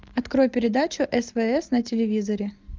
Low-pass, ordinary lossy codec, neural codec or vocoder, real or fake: 7.2 kHz; Opus, 32 kbps; none; real